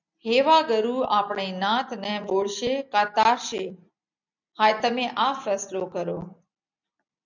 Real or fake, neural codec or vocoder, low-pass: real; none; 7.2 kHz